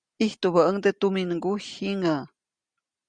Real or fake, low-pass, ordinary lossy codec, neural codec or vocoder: real; 9.9 kHz; Opus, 64 kbps; none